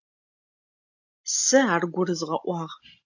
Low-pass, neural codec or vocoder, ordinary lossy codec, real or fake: 7.2 kHz; none; AAC, 48 kbps; real